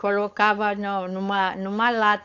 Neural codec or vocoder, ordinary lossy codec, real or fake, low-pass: codec, 16 kHz, 4.8 kbps, FACodec; AAC, 48 kbps; fake; 7.2 kHz